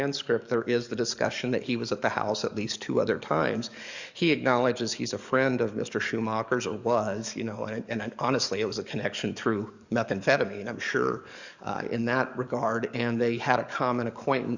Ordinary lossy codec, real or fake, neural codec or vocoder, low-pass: Opus, 64 kbps; fake; codec, 44.1 kHz, 7.8 kbps, DAC; 7.2 kHz